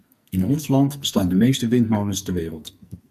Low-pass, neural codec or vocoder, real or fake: 14.4 kHz; codec, 44.1 kHz, 2.6 kbps, SNAC; fake